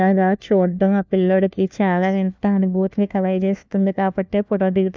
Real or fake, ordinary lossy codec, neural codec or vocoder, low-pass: fake; none; codec, 16 kHz, 1 kbps, FunCodec, trained on LibriTTS, 50 frames a second; none